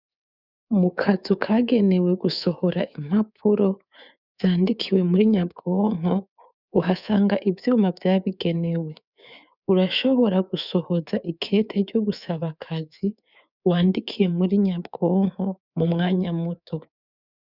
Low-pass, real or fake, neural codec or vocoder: 5.4 kHz; fake; codec, 24 kHz, 3.1 kbps, DualCodec